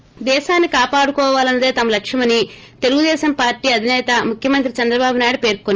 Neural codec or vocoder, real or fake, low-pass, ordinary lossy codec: none; real; 7.2 kHz; Opus, 24 kbps